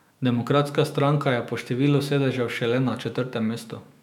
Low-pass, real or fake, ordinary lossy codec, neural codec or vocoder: 19.8 kHz; fake; none; autoencoder, 48 kHz, 128 numbers a frame, DAC-VAE, trained on Japanese speech